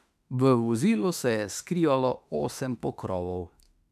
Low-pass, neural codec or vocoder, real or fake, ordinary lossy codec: 14.4 kHz; autoencoder, 48 kHz, 32 numbers a frame, DAC-VAE, trained on Japanese speech; fake; none